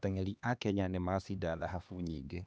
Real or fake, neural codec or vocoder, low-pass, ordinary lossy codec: fake; codec, 16 kHz, 2 kbps, X-Codec, HuBERT features, trained on LibriSpeech; none; none